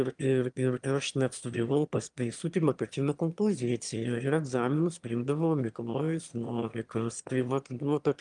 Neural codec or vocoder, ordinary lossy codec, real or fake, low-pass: autoencoder, 22.05 kHz, a latent of 192 numbers a frame, VITS, trained on one speaker; Opus, 32 kbps; fake; 9.9 kHz